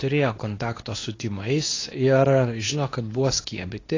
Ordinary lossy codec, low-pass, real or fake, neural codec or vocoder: AAC, 32 kbps; 7.2 kHz; fake; codec, 24 kHz, 0.9 kbps, WavTokenizer, medium speech release version 2